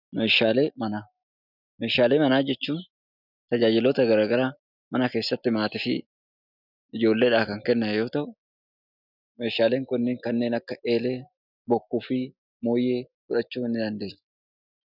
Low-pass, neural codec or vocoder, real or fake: 5.4 kHz; none; real